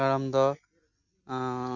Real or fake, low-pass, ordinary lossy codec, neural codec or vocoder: real; 7.2 kHz; none; none